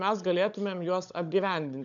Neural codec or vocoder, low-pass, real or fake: codec, 16 kHz, 16 kbps, FunCodec, trained on LibriTTS, 50 frames a second; 7.2 kHz; fake